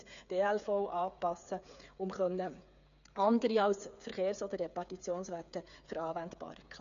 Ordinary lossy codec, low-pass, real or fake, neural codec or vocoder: none; 7.2 kHz; fake; codec, 16 kHz, 8 kbps, FreqCodec, smaller model